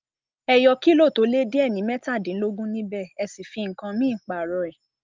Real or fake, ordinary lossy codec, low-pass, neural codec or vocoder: real; Opus, 24 kbps; 7.2 kHz; none